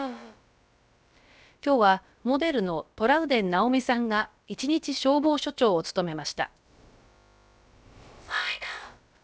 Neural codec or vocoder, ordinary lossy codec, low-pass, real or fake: codec, 16 kHz, about 1 kbps, DyCAST, with the encoder's durations; none; none; fake